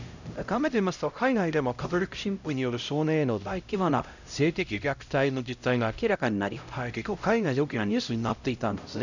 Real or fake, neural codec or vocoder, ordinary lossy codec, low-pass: fake; codec, 16 kHz, 0.5 kbps, X-Codec, HuBERT features, trained on LibriSpeech; none; 7.2 kHz